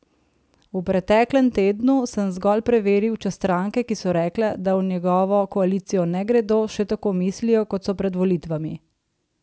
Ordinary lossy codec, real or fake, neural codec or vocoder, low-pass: none; real; none; none